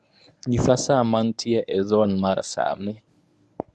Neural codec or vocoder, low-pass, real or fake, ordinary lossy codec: codec, 24 kHz, 0.9 kbps, WavTokenizer, medium speech release version 1; none; fake; none